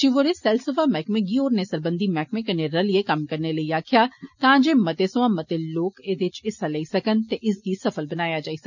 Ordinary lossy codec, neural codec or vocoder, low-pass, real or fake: none; none; 7.2 kHz; real